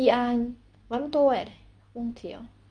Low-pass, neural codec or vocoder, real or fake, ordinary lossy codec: 9.9 kHz; codec, 24 kHz, 0.9 kbps, WavTokenizer, medium speech release version 2; fake; none